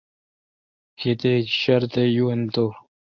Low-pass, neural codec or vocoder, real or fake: 7.2 kHz; codec, 24 kHz, 0.9 kbps, WavTokenizer, medium speech release version 1; fake